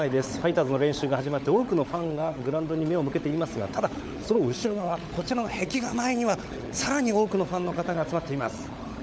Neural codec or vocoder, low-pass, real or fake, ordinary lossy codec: codec, 16 kHz, 16 kbps, FunCodec, trained on LibriTTS, 50 frames a second; none; fake; none